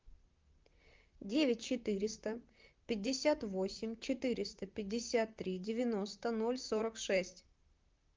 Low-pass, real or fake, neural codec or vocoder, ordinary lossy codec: 7.2 kHz; fake; vocoder, 44.1 kHz, 128 mel bands, Pupu-Vocoder; Opus, 24 kbps